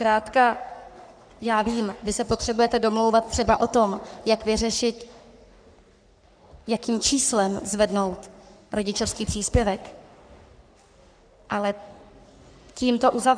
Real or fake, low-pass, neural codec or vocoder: fake; 9.9 kHz; codec, 44.1 kHz, 3.4 kbps, Pupu-Codec